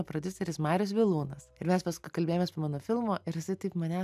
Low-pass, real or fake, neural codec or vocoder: 14.4 kHz; real; none